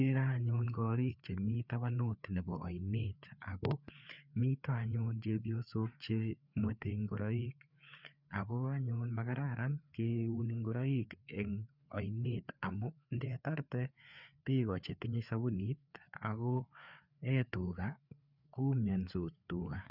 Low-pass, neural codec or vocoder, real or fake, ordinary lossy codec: 5.4 kHz; codec, 16 kHz, 4 kbps, FreqCodec, larger model; fake; none